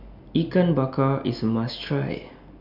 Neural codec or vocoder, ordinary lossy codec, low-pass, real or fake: none; none; 5.4 kHz; real